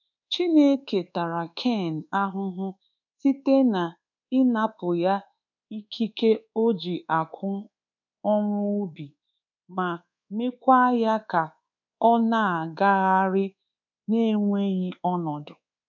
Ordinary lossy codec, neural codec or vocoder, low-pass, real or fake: none; codec, 24 kHz, 1.2 kbps, DualCodec; 7.2 kHz; fake